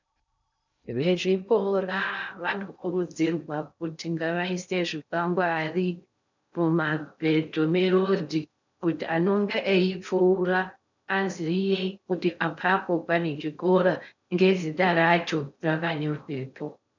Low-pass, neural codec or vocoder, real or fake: 7.2 kHz; codec, 16 kHz in and 24 kHz out, 0.6 kbps, FocalCodec, streaming, 2048 codes; fake